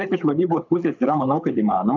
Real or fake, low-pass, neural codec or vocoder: fake; 7.2 kHz; codec, 24 kHz, 6 kbps, HILCodec